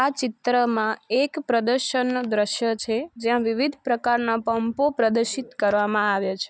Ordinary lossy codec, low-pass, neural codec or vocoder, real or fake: none; none; none; real